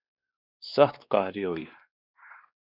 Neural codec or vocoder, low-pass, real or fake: codec, 16 kHz, 2 kbps, X-Codec, WavLM features, trained on Multilingual LibriSpeech; 5.4 kHz; fake